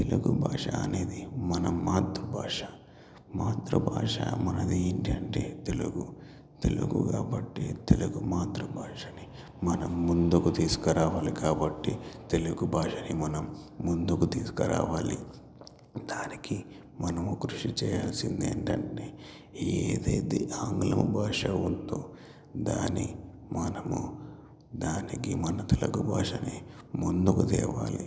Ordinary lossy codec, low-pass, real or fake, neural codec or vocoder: none; none; real; none